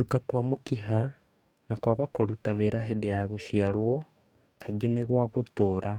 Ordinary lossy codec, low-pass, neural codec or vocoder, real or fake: none; 19.8 kHz; codec, 44.1 kHz, 2.6 kbps, DAC; fake